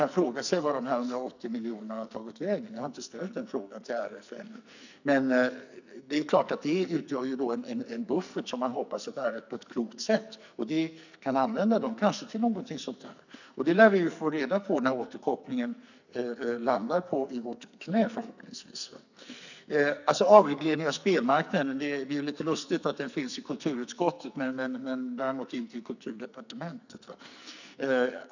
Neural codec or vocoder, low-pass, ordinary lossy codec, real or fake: codec, 44.1 kHz, 2.6 kbps, SNAC; 7.2 kHz; none; fake